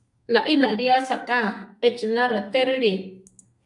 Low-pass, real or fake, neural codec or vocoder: 10.8 kHz; fake; codec, 32 kHz, 1.9 kbps, SNAC